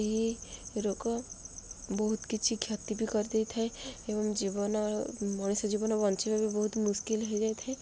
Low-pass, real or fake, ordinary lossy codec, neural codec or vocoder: none; real; none; none